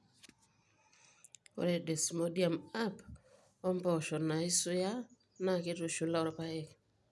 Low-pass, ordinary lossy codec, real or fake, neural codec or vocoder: none; none; real; none